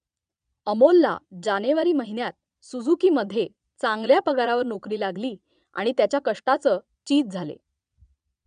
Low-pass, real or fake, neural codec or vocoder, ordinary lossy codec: 9.9 kHz; fake; vocoder, 22.05 kHz, 80 mel bands, Vocos; none